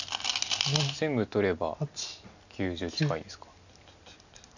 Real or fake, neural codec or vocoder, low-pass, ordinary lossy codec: real; none; 7.2 kHz; none